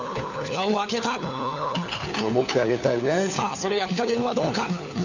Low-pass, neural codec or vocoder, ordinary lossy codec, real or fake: 7.2 kHz; codec, 16 kHz, 4 kbps, FunCodec, trained on LibriTTS, 50 frames a second; none; fake